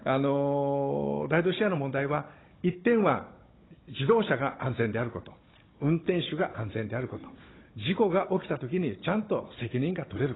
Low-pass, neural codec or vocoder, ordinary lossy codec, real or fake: 7.2 kHz; codec, 16 kHz, 16 kbps, FunCodec, trained on Chinese and English, 50 frames a second; AAC, 16 kbps; fake